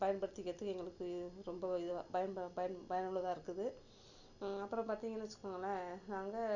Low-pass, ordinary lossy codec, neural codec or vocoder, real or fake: 7.2 kHz; none; none; real